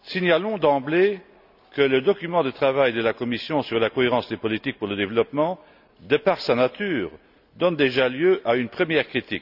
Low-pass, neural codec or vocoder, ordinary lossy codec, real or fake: 5.4 kHz; none; none; real